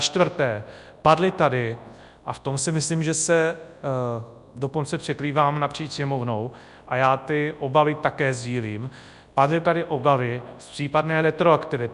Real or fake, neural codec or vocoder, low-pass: fake; codec, 24 kHz, 0.9 kbps, WavTokenizer, large speech release; 10.8 kHz